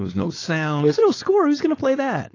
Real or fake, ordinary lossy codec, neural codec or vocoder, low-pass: fake; AAC, 32 kbps; codec, 16 kHz, 4.8 kbps, FACodec; 7.2 kHz